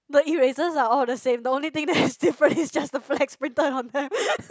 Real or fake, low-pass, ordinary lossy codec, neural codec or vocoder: real; none; none; none